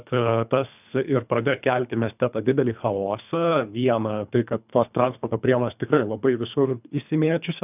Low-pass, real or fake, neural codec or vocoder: 3.6 kHz; fake; codec, 24 kHz, 3 kbps, HILCodec